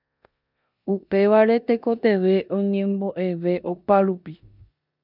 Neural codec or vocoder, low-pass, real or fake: codec, 16 kHz in and 24 kHz out, 0.9 kbps, LongCat-Audio-Codec, four codebook decoder; 5.4 kHz; fake